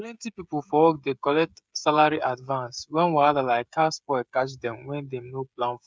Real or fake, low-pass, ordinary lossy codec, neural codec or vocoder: fake; none; none; codec, 16 kHz, 16 kbps, FreqCodec, smaller model